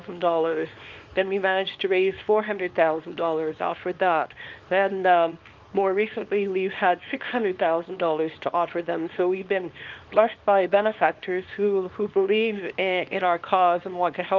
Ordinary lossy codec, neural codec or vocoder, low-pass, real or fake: Opus, 32 kbps; codec, 24 kHz, 0.9 kbps, WavTokenizer, small release; 7.2 kHz; fake